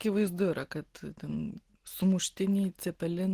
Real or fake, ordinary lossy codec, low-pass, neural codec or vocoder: real; Opus, 16 kbps; 14.4 kHz; none